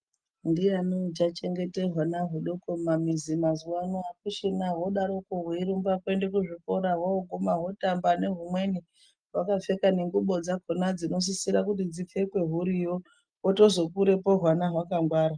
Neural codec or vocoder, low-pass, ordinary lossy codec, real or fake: none; 9.9 kHz; Opus, 32 kbps; real